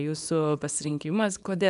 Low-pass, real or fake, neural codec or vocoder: 10.8 kHz; fake; codec, 24 kHz, 0.9 kbps, WavTokenizer, small release